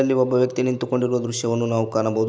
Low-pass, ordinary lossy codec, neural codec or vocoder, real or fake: none; none; none; real